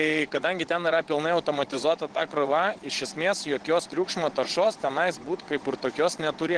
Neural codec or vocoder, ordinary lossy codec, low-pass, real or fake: vocoder, 22.05 kHz, 80 mel bands, WaveNeXt; Opus, 16 kbps; 9.9 kHz; fake